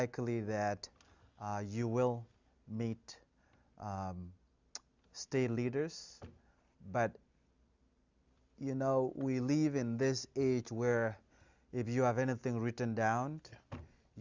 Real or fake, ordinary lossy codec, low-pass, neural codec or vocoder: real; Opus, 64 kbps; 7.2 kHz; none